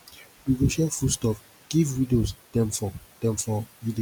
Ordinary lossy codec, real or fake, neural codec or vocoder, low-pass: none; real; none; none